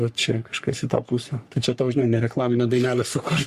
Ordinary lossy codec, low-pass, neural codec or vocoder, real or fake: Opus, 64 kbps; 14.4 kHz; codec, 44.1 kHz, 3.4 kbps, Pupu-Codec; fake